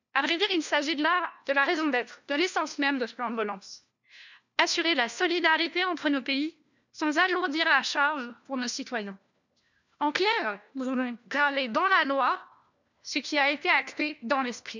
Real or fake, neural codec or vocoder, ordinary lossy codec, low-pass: fake; codec, 16 kHz, 1 kbps, FunCodec, trained on LibriTTS, 50 frames a second; none; 7.2 kHz